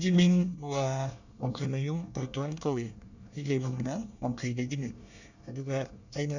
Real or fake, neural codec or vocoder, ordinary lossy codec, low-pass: fake; codec, 24 kHz, 1 kbps, SNAC; none; 7.2 kHz